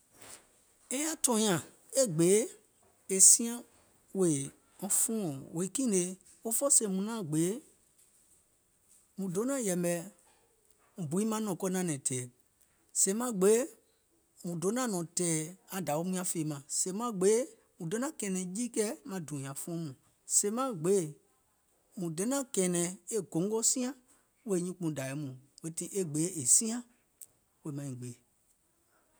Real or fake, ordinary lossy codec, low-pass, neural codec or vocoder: real; none; none; none